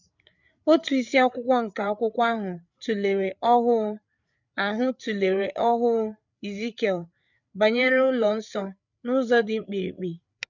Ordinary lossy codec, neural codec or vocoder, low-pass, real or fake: none; codec, 16 kHz, 8 kbps, FreqCodec, larger model; 7.2 kHz; fake